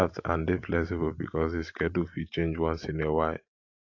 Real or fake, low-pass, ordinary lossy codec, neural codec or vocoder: fake; 7.2 kHz; AAC, 48 kbps; vocoder, 44.1 kHz, 128 mel bands every 512 samples, BigVGAN v2